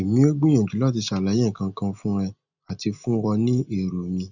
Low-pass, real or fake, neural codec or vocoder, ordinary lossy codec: 7.2 kHz; real; none; none